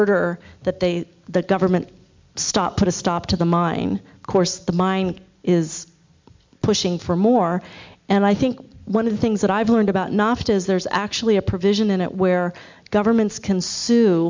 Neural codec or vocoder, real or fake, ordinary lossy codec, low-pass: none; real; MP3, 64 kbps; 7.2 kHz